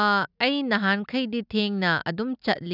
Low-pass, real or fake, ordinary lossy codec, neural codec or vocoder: 5.4 kHz; real; none; none